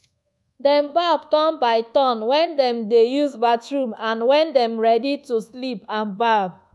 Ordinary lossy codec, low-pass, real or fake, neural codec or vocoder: none; none; fake; codec, 24 kHz, 1.2 kbps, DualCodec